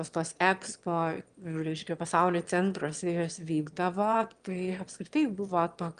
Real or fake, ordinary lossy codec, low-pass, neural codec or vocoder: fake; Opus, 32 kbps; 9.9 kHz; autoencoder, 22.05 kHz, a latent of 192 numbers a frame, VITS, trained on one speaker